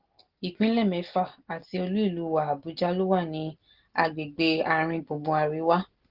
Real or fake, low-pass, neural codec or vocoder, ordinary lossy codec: real; 5.4 kHz; none; Opus, 16 kbps